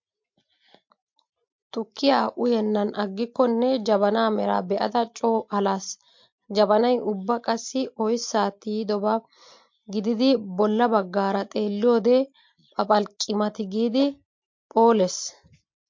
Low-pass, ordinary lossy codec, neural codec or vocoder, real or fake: 7.2 kHz; MP3, 48 kbps; none; real